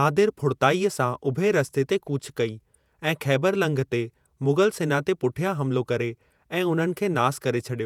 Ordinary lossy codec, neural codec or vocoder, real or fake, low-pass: none; vocoder, 48 kHz, 128 mel bands, Vocos; fake; 19.8 kHz